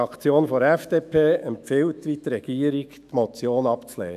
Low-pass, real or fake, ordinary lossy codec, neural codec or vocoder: 14.4 kHz; fake; AAC, 96 kbps; autoencoder, 48 kHz, 128 numbers a frame, DAC-VAE, trained on Japanese speech